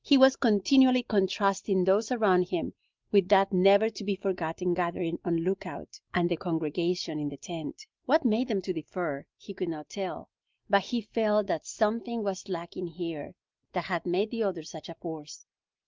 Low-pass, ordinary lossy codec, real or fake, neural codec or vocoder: 7.2 kHz; Opus, 32 kbps; real; none